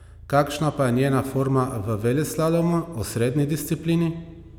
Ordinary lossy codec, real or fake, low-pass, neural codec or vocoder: none; real; 19.8 kHz; none